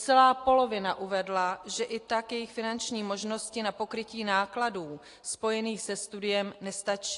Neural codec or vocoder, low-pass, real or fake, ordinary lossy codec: none; 10.8 kHz; real; AAC, 48 kbps